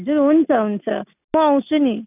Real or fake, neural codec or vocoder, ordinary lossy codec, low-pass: real; none; none; 3.6 kHz